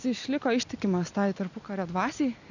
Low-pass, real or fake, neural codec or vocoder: 7.2 kHz; real; none